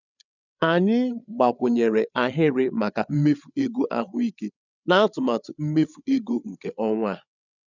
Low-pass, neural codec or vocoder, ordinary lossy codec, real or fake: 7.2 kHz; codec, 16 kHz, 8 kbps, FreqCodec, larger model; none; fake